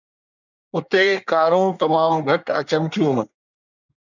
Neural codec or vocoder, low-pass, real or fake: codec, 24 kHz, 1 kbps, SNAC; 7.2 kHz; fake